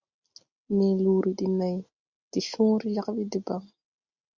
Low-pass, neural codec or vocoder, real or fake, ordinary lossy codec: 7.2 kHz; none; real; Opus, 64 kbps